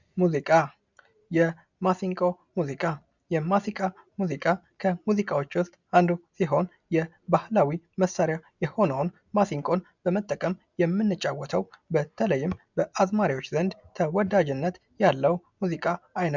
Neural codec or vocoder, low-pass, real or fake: none; 7.2 kHz; real